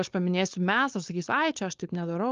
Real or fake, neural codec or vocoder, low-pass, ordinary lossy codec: fake; codec, 16 kHz, 4.8 kbps, FACodec; 7.2 kHz; Opus, 32 kbps